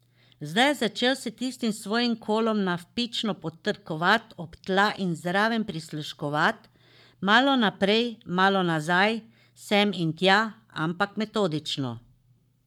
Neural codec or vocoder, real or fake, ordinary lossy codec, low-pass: codec, 44.1 kHz, 7.8 kbps, Pupu-Codec; fake; none; 19.8 kHz